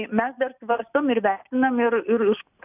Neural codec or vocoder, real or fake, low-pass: none; real; 3.6 kHz